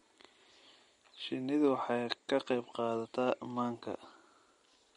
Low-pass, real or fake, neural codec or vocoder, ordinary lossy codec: 10.8 kHz; real; none; MP3, 48 kbps